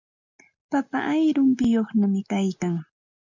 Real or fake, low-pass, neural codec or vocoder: real; 7.2 kHz; none